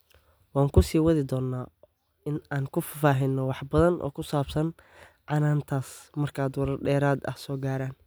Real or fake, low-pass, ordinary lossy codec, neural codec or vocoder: real; none; none; none